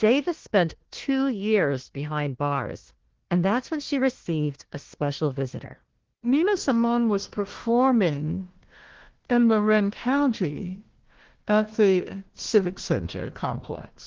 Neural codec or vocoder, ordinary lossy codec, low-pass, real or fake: codec, 16 kHz, 1 kbps, FunCodec, trained on Chinese and English, 50 frames a second; Opus, 16 kbps; 7.2 kHz; fake